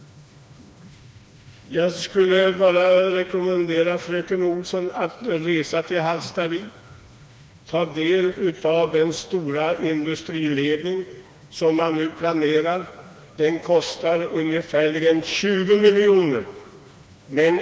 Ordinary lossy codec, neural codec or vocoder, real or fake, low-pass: none; codec, 16 kHz, 2 kbps, FreqCodec, smaller model; fake; none